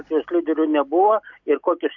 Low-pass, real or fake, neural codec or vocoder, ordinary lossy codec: 7.2 kHz; real; none; MP3, 64 kbps